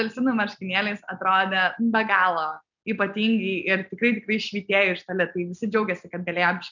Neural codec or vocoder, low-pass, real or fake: none; 7.2 kHz; real